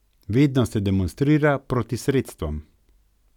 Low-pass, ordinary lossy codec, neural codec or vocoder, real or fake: 19.8 kHz; none; none; real